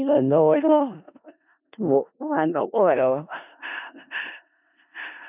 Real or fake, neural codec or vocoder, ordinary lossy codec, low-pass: fake; codec, 16 kHz in and 24 kHz out, 0.4 kbps, LongCat-Audio-Codec, four codebook decoder; none; 3.6 kHz